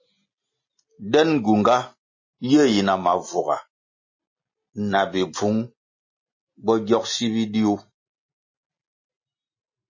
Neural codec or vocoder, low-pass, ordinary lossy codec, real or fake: none; 7.2 kHz; MP3, 32 kbps; real